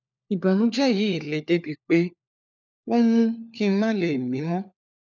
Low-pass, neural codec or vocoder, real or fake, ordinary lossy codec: 7.2 kHz; codec, 16 kHz, 4 kbps, FunCodec, trained on LibriTTS, 50 frames a second; fake; none